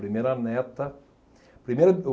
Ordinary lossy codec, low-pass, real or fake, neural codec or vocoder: none; none; real; none